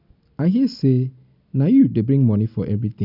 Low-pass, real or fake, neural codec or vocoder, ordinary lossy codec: 5.4 kHz; real; none; none